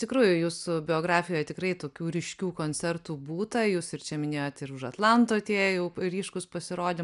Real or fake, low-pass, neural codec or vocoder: real; 10.8 kHz; none